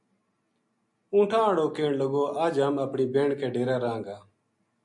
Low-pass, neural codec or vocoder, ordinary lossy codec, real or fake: 10.8 kHz; none; MP3, 48 kbps; real